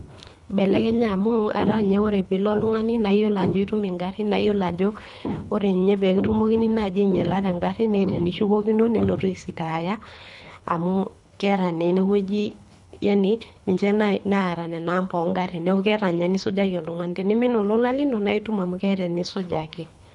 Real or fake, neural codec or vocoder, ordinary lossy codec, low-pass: fake; codec, 24 kHz, 3 kbps, HILCodec; none; 10.8 kHz